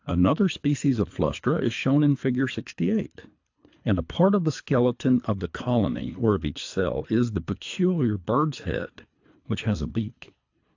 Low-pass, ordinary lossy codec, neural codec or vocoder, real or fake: 7.2 kHz; AAC, 48 kbps; codec, 24 kHz, 3 kbps, HILCodec; fake